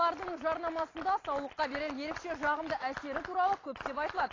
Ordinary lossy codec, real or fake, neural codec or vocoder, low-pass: AAC, 32 kbps; real; none; 7.2 kHz